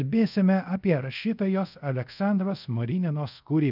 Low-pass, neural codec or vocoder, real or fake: 5.4 kHz; codec, 24 kHz, 0.5 kbps, DualCodec; fake